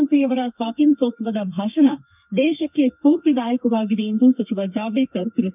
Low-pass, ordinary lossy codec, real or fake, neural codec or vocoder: 3.6 kHz; none; fake; codec, 32 kHz, 1.9 kbps, SNAC